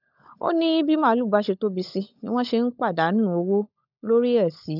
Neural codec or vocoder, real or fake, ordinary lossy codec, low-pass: codec, 16 kHz, 16 kbps, FunCodec, trained on LibriTTS, 50 frames a second; fake; none; 5.4 kHz